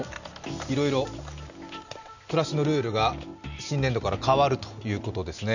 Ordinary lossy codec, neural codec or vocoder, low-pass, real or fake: none; none; 7.2 kHz; real